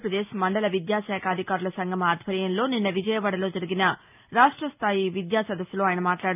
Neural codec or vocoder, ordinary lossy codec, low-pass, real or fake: none; none; 3.6 kHz; real